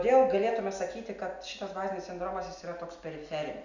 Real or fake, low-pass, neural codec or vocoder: real; 7.2 kHz; none